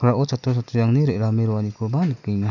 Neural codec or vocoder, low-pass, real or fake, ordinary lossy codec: none; 7.2 kHz; real; none